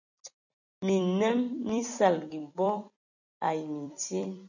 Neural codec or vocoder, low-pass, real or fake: vocoder, 44.1 kHz, 128 mel bands every 256 samples, BigVGAN v2; 7.2 kHz; fake